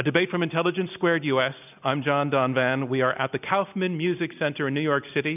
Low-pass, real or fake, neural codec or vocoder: 3.6 kHz; real; none